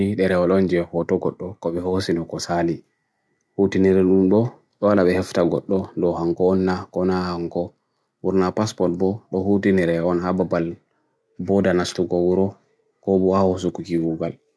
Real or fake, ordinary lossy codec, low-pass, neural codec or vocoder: real; none; none; none